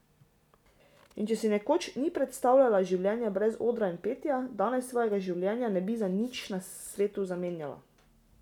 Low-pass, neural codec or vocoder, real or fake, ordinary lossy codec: 19.8 kHz; none; real; none